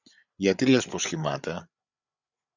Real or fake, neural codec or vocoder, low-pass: fake; codec, 16 kHz, 8 kbps, FreqCodec, larger model; 7.2 kHz